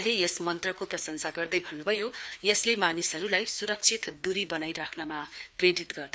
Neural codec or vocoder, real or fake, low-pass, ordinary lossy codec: codec, 16 kHz, 2 kbps, FreqCodec, larger model; fake; none; none